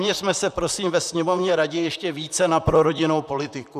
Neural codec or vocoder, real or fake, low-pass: vocoder, 44.1 kHz, 128 mel bands, Pupu-Vocoder; fake; 14.4 kHz